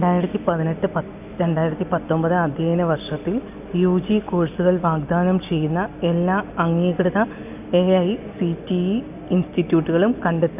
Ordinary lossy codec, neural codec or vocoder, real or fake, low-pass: none; none; real; 3.6 kHz